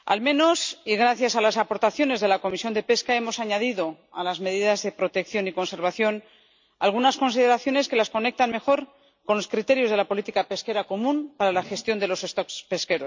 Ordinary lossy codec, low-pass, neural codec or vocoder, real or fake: MP3, 64 kbps; 7.2 kHz; none; real